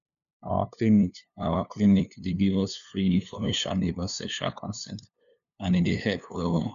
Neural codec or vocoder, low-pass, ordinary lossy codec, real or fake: codec, 16 kHz, 2 kbps, FunCodec, trained on LibriTTS, 25 frames a second; 7.2 kHz; AAC, 96 kbps; fake